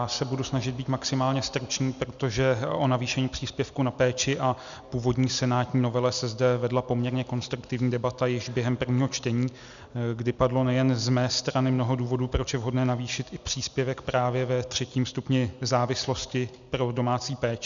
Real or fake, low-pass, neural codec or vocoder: real; 7.2 kHz; none